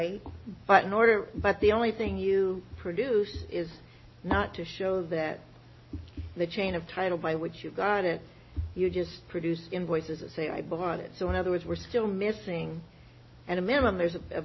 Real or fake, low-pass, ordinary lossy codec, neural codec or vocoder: real; 7.2 kHz; MP3, 24 kbps; none